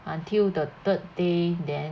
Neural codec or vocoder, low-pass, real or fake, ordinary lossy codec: none; none; real; none